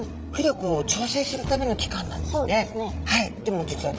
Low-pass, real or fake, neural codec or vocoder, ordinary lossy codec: none; fake; codec, 16 kHz, 16 kbps, FreqCodec, larger model; none